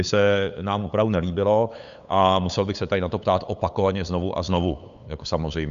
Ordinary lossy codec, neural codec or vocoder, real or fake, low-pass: Opus, 64 kbps; codec, 16 kHz, 8 kbps, FunCodec, trained on LibriTTS, 25 frames a second; fake; 7.2 kHz